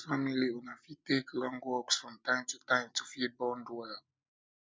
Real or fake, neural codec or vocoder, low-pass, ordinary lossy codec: real; none; none; none